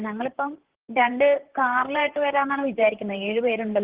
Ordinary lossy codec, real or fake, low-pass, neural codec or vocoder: Opus, 16 kbps; fake; 3.6 kHz; vocoder, 44.1 kHz, 128 mel bands, Pupu-Vocoder